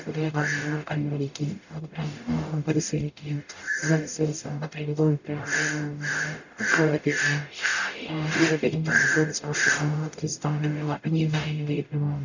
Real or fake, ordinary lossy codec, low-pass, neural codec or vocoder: fake; none; 7.2 kHz; codec, 44.1 kHz, 0.9 kbps, DAC